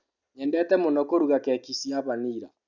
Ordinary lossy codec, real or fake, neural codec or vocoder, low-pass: none; real; none; 7.2 kHz